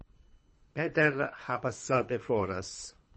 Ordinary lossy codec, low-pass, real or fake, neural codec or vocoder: MP3, 32 kbps; 10.8 kHz; fake; codec, 24 kHz, 3 kbps, HILCodec